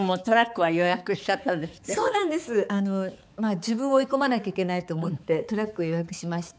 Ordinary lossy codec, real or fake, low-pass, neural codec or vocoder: none; fake; none; codec, 16 kHz, 4 kbps, X-Codec, HuBERT features, trained on balanced general audio